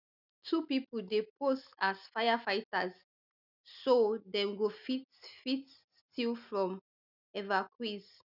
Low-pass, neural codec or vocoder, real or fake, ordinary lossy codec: 5.4 kHz; none; real; none